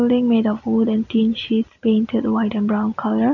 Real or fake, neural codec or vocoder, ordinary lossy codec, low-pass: real; none; none; 7.2 kHz